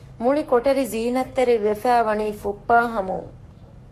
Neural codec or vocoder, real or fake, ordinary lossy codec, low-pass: codec, 44.1 kHz, 7.8 kbps, Pupu-Codec; fake; MP3, 64 kbps; 14.4 kHz